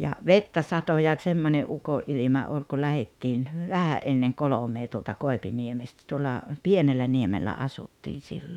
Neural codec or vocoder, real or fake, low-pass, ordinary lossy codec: autoencoder, 48 kHz, 32 numbers a frame, DAC-VAE, trained on Japanese speech; fake; 19.8 kHz; none